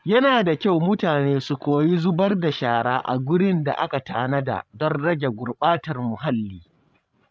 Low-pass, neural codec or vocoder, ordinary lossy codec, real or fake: none; codec, 16 kHz, 16 kbps, FreqCodec, larger model; none; fake